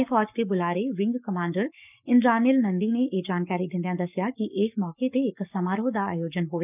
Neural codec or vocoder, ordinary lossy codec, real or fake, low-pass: codec, 44.1 kHz, 7.8 kbps, Pupu-Codec; none; fake; 3.6 kHz